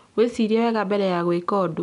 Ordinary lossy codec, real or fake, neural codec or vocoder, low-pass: none; real; none; 10.8 kHz